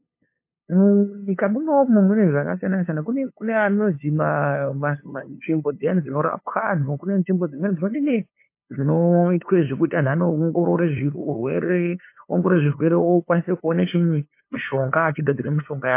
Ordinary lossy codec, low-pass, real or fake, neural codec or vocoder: MP3, 24 kbps; 3.6 kHz; fake; codec, 16 kHz, 2 kbps, FunCodec, trained on LibriTTS, 25 frames a second